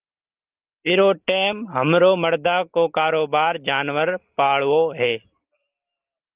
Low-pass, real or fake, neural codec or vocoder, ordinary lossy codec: 3.6 kHz; real; none; Opus, 32 kbps